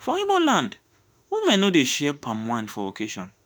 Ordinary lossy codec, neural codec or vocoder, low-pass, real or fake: none; autoencoder, 48 kHz, 32 numbers a frame, DAC-VAE, trained on Japanese speech; none; fake